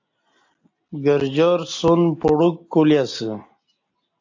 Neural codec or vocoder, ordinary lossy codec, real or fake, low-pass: none; AAC, 48 kbps; real; 7.2 kHz